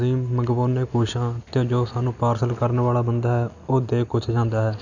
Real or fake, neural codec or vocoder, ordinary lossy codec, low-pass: real; none; none; 7.2 kHz